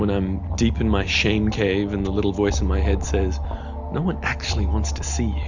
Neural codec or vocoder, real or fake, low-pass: none; real; 7.2 kHz